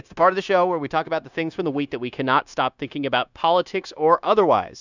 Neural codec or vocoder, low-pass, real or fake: codec, 16 kHz, 0.9 kbps, LongCat-Audio-Codec; 7.2 kHz; fake